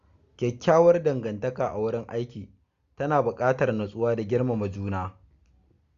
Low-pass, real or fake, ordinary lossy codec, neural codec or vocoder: 7.2 kHz; real; Opus, 64 kbps; none